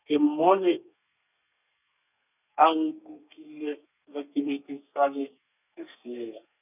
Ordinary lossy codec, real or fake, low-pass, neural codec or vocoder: none; fake; 3.6 kHz; codec, 32 kHz, 1.9 kbps, SNAC